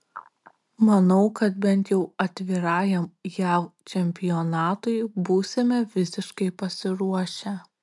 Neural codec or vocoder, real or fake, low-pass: none; real; 10.8 kHz